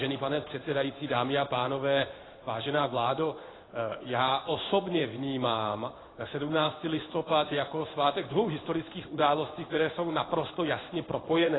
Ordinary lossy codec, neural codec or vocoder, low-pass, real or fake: AAC, 16 kbps; codec, 16 kHz in and 24 kHz out, 1 kbps, XY-Tokenizer; 7.2 kHz; fake